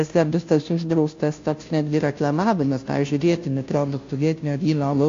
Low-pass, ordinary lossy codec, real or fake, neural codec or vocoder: 7.2 kHz; AAC, 48 kbps; fake; codec, 16 kHz, 0.5 kbps, FunCodec, trained on Chinese and English, 25 frames a second